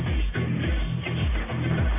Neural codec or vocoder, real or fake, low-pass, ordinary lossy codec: codec, 44.1 kHz, 1.7 kbps, Pupu-Codec; fake; 3.6 kHz; MP3, 24 kbps